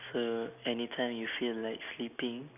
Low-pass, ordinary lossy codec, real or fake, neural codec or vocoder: 3.6 kHz; none; real; none